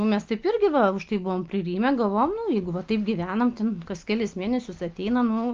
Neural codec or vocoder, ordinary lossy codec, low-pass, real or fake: none; Opus, 32 kbps; 7.2 kHz; real